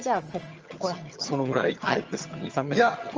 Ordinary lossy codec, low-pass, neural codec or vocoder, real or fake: Opus, 24 kbps; 7.2 kHz; vocoder, 22.05 kHz, 80 mel bands, HiFi-GAN; fake